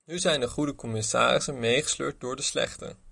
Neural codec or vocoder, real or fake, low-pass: none; real; 10.8 kHz